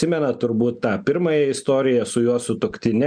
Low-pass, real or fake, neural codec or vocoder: 9.9 kHz; real; none